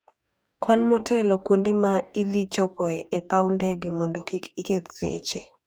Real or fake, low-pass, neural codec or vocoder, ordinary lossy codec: fake; none; codec, 44.1 kHz, 2.6 kbps, DAC; none